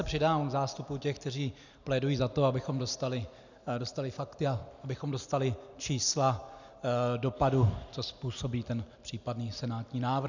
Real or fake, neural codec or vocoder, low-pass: real; none; 7.2 kHz